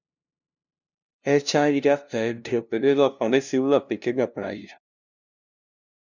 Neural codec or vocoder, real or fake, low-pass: codec, 16 kHz, 0.5 kbps, FunCodec, trained on LibriTTS, 25 frames a second; fake; 7.2 kHz